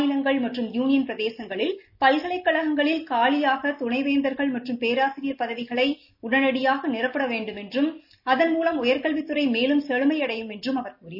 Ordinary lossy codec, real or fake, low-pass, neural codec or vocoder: none; real; 5.4 kHz; none